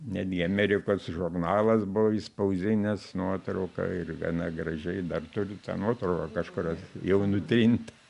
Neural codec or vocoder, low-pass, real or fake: none; 10.8 kHz; real